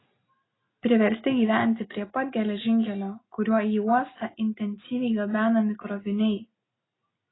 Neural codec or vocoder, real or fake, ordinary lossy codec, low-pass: none; real; AAC, 16 kbps; 7.2 kHz